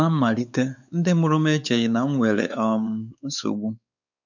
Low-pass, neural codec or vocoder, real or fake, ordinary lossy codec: 7.2 kHz; codec, 16 kHz, 4 kbps, X-Codec, WavLM features, trained on Multilingual LibriSpeech; fake; none